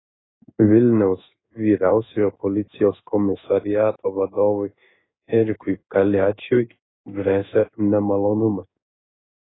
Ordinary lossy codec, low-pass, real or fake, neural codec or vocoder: AAC, 16 kbps; 7.2 kHz; fake; codec, 16 kHz in and 24 kHz out, 1 kbps, XY-Tokenizer